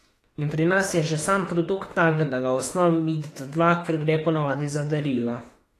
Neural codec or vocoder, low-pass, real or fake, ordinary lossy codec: autoencoder, 48 kHz, 32 numbers a frame, DAC-VAE, trained on Japanese speech; 14.4 kHz; fake; AAC, 48 kbps